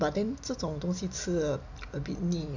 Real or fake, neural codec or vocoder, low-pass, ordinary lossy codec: real; none; 7.2 kHz; none